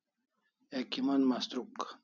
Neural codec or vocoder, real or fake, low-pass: none; real; 7.2 kHz